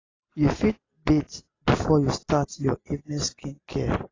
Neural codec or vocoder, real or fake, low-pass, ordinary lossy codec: none; real; 7.2 kHz; AAC, 32 kbps